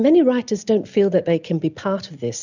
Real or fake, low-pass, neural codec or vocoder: real; 7.2 kHz; none